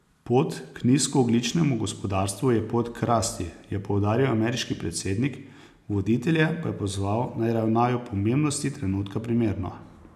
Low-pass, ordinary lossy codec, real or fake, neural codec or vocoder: 14.4 kHz; none; real; none